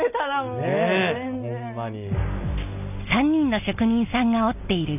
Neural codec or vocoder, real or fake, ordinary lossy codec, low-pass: none; real; none; 3.6 kHz